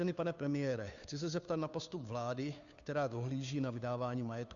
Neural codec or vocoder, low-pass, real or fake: codec, 16 kHz, 2 kbps, FunCodec, trained on Chinese and English, 25 frames a second; 7.2 kHz; fake